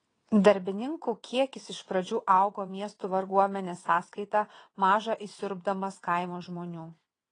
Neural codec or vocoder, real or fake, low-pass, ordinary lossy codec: vocoder, 22.05 kHz, 80 mel bands, WaveNeXt; fake; 9.9 kHz; AAC, 32 kbps